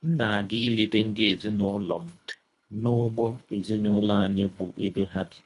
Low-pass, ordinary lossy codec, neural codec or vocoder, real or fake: 10.8 kHz; none; codec, 24 kHz, 1.5 kbps, HILCodec; fake